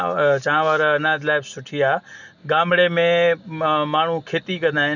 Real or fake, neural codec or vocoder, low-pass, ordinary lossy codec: real; none; 7.2 kHz; none